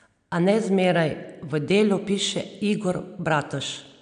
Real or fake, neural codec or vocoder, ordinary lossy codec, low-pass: real; none; AAC, 96 kbps; 9.9 kHz